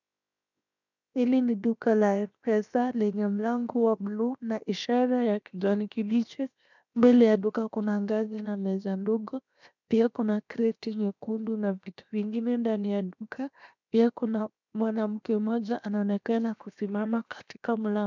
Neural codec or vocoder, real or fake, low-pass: codec, 16 kHz, 0.7 kbps, FocalCodec; fake; 7.2 kHz